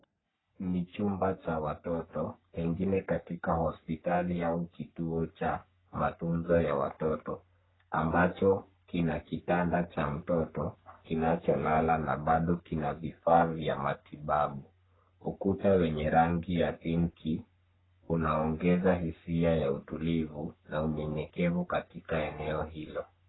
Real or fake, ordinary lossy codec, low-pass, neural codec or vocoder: fake; AAC, 16 kbps; 7.2 kHz; codec, 44.1 kHz, 3.4 kbps, Pupu-Codec